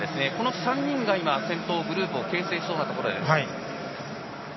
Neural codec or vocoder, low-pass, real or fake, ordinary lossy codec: none; 7.2 kHz; real; MP3, 24 kbps